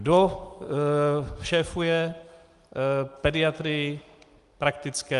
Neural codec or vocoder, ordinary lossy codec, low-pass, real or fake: none; Opus, 32 kbps; 10.8 kHz; real